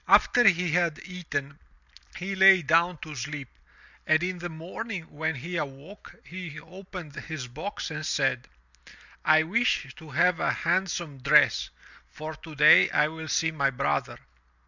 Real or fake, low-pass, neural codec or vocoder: real; 7.2 kHz; none